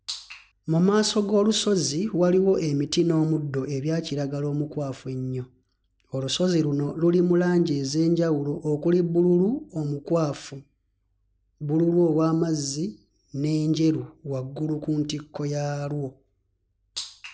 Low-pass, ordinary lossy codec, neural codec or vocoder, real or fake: none; none; none; real